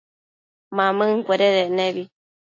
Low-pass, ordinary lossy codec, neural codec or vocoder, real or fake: 7.2 kHz; AAC, 48 kbps; none; real